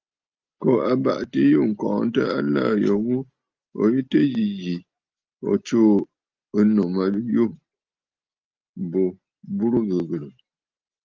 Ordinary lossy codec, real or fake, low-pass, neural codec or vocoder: Opus, 24 kbps; real; 7.2 kHz; none